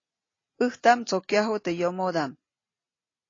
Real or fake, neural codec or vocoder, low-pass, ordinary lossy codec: real; none; 7.2 kHz; AAC, 32 kbps